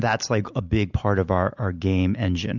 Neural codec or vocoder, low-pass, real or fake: none; 7.2 kHz; real